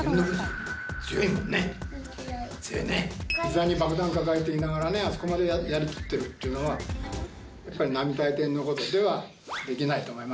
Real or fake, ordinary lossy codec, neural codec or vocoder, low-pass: real; none; none; none